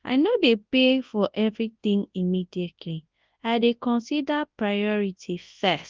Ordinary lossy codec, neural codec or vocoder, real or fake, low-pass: Opus, 32 kbps; codec, 24 kHz, 0.9 kbps, WavTokenizer, large speech release; fake; 7.2 kHz